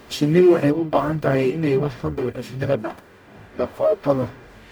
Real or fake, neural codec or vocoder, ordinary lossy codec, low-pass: fake; codec, 44.1 kHz, 0.9 kbps, DAC; none; none